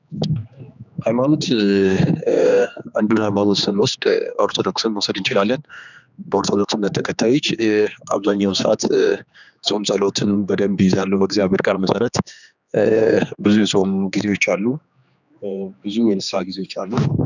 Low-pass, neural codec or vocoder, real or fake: 7.2 kHz; codec, 16 kHz, 2 kbps, X-Codec, HuBERT features, trained on general audio; fake